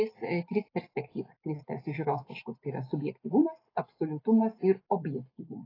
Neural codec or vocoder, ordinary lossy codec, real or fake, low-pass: none; AAC, 24 kbps; real; 5.4 kHz